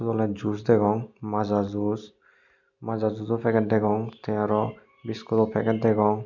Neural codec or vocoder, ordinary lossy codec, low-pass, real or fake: none; none; 7.2 kHz; real